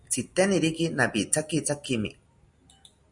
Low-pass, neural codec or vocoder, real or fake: 10.8 kHz; none; real